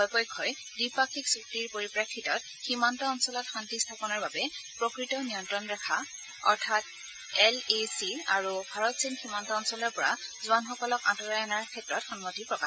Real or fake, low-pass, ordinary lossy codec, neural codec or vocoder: real; none; none; none